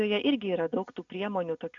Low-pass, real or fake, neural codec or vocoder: 7.2 kHz; real; none